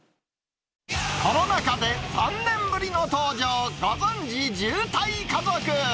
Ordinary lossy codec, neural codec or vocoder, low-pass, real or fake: none; none; none; real